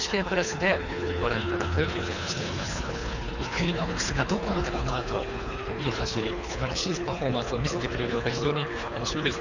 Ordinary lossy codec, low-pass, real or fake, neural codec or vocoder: none; 7.2 kHz; fake; codec, 24 kHz, 3 kbps, HILCodec